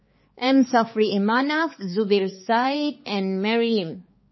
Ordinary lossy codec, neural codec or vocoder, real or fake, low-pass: MP3, 24 kbps; codec, 16 kHz, 4 kbps, X-Codec, HuBERT features, trained on balanced general audio; fake; 7.2 kHz